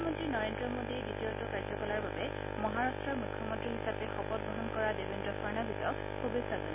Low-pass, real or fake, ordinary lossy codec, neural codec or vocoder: 3.6 kHz; real; none; none